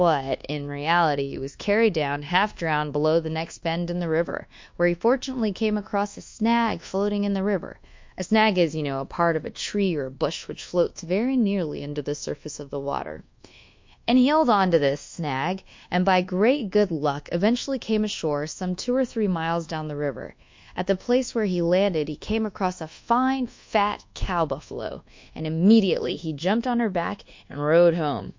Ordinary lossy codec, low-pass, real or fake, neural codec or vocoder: MP3, 48 kbps; 7.2 kHz; fake; codec, 24 kHz, 1.2 kbps, DualCodec